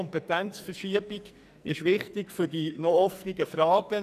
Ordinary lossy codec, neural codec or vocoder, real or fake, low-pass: none; codec, 44.1 kHz, 2.6 kbps, SNAC; fake; 14.4 kHz